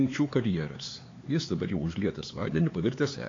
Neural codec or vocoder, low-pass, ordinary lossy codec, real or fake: codec, 16 kHz, 4 kbps, X-Codec, HuBERT features, trained on LibriSpeech; 7.2 kHz; AAC, 32 kbps; fake